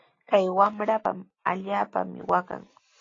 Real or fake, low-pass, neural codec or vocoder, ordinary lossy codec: real; 7.2 kHz; none; AAC, 32 kbps